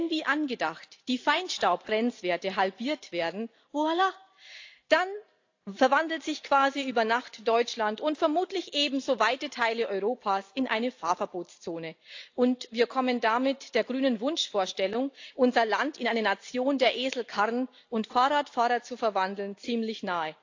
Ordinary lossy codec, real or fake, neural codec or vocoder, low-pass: AAC, 48 kbps; real; none; 7.2 kHz